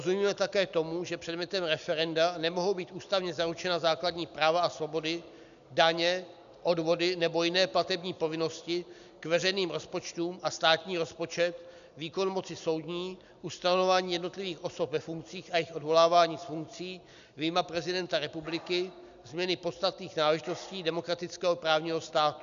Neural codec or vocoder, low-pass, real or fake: none; 7.2 kHz; real